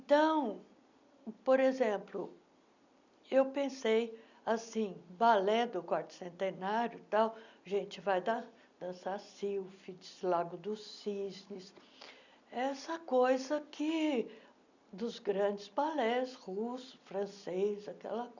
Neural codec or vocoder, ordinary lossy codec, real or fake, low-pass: none; none; real; 7.2 kHz